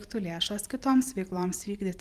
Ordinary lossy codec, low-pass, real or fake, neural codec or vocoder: Opus, 32 kbps; 14.4 kHz; fake; vocoder, 44.1 kHz, 128 mel bands every 512 samples, BigVGAN v2